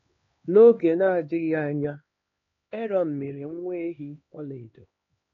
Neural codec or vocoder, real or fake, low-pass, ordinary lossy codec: codec, 16 kHz, 2 kbps, X-Codec, HuBERT features, trained on LibriSpeech; fake; 7.2 kHz; AAC, 32 kbps